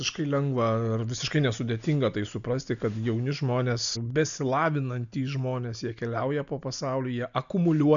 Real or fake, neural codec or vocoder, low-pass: real; none; 7.2 kHz